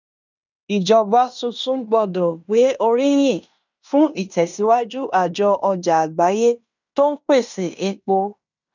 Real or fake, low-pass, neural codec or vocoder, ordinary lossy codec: fake; 7.2 kHz; codec, 16 kHz in and 24 kHz out, 0.9 kbps, LongCat-Audio-Codec, fine tuned four codebook decoder; none